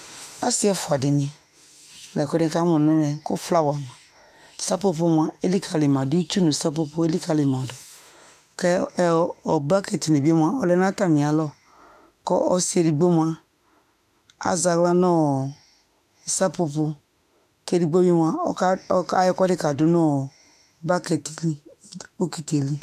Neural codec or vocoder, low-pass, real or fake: autoencoder, 48 kHz, 32 numbers a frame, DAC-VAE, trained on Japanese speech; 14.4 kHz; fake